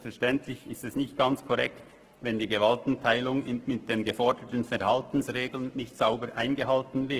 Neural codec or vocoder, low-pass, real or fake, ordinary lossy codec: codec, 44.1 kHz, 7.8 kbps, Pupu-Codec; 14.4 kHz; fake; Opus, 16 kbps